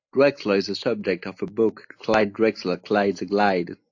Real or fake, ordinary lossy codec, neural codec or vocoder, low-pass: real; AAC, 48 kbps; none; 7.2 kHz